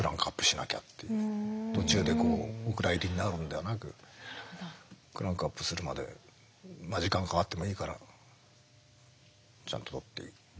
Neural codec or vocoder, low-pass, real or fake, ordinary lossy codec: none; none; real; none